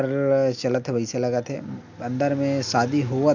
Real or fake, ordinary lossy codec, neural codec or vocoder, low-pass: real; none; none; 7.2 kHz